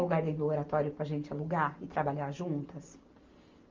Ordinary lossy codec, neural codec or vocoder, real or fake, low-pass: Opus, 24 kbps; none; real; 7.2 kHz